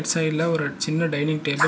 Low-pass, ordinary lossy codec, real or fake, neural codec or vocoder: none; none; real; none